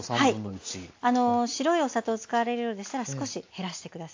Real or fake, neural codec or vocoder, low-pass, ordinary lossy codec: real; none; 7.2 kHz; AAC, 48 kbps